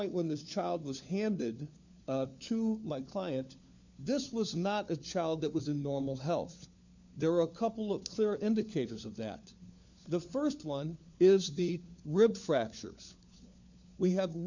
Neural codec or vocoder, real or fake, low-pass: codec, 16 kHz, 4 kbps, FunCodec, trained on LibriTTS, 50 frames a second; fake; 7.2 kHz